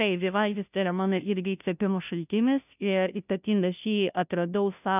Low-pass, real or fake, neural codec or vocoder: 3.6 kHz; fake; codec, 16 kHz, 0.5 kbps, FunCodec, trained on Chinese and English, 25 frames a second